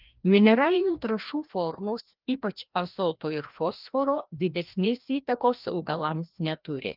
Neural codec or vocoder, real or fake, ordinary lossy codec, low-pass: codec, 16 kHz, 1 kbps, FreqCodec, larger model; fake; Opus, 32 kbps; 5.4 kHz